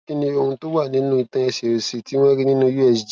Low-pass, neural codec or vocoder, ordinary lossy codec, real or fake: none; none; none; real